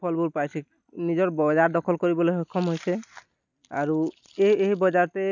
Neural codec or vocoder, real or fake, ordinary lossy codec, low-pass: none; real; none; 7.2 kHz